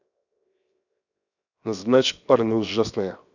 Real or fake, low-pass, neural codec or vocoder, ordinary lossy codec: fake; 7.2 kHz; codec, 16 kHz, 0.7 kbps, FocalCodec; none